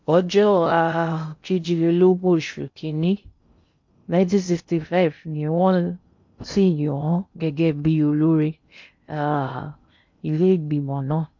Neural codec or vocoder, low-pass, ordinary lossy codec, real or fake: codec, 16 kHz in and 24 kHz out, 0.6 kbps, FocalCodec, streaming, 2048 codes; 7.2 kHz; MP3, 48 kbps; fake